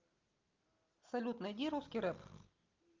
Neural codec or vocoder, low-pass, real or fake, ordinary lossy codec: none; 7.2 kHz; real; Opus, 32 kbps